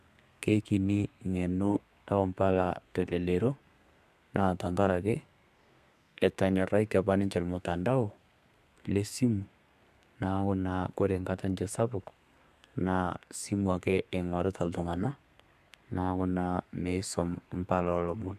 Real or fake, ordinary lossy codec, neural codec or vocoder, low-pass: fake; none; codec, 32 kHz, 1.9 kbps, SNAC; 14.4 kHz